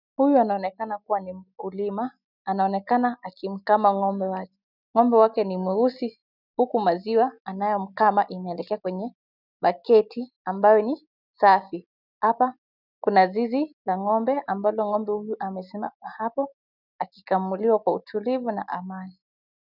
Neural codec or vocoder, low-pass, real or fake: none; 5.4 kHz; real